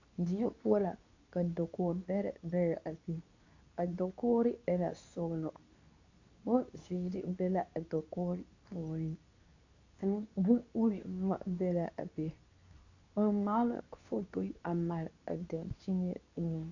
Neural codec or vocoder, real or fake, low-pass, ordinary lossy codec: codec, 24 kHz, 0.9 kbps, WavTokenizer, medium speech release version 2; fake; 7.2 kHz; AAC, 48 kbps